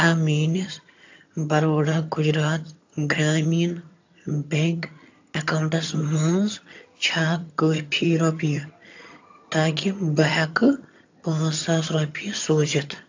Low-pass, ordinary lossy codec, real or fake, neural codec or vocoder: 7.2 kHz; AAC, 48 kbps; fake; vocoder, 22.05 kHz, 80 mel bands, HiFi-GAN